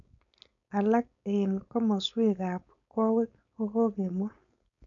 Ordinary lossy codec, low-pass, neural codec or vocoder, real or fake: none; 7.2 kHz; codec, 16 kHz, 4.8 kbps, FACodec; fake